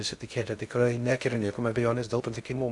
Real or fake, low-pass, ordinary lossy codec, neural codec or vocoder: fake; 10.8 kHz; MP3, 96 kbps; codec, 16 kHz in and 24 kHz out, 0.6 kbps, FocalCodec, streaming, 2048 codes